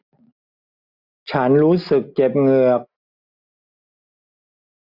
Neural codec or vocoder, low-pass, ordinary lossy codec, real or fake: none; 5.4 kHz; none; real